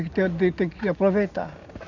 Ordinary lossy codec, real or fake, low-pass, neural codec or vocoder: none; real; 7.2 kHz; none